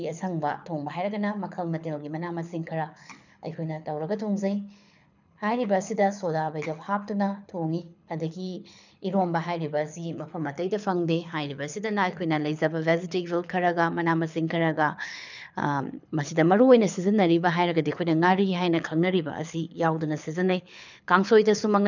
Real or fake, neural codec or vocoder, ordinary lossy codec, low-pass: fake; codec, 24 kHz, 6 kbps, HILCodec; none; 7.2 kHz